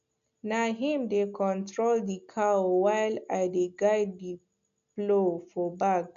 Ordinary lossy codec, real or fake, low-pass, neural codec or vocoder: none; real; 7.2 kHz; none